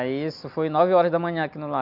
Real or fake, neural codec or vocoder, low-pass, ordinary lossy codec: real; none; 5.4 kHz; none